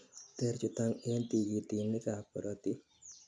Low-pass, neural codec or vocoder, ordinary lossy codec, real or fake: 9.9 kHz; none; none; real